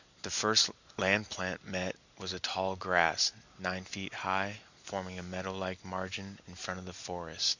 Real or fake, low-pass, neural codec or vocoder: real; 7.2 kHz; none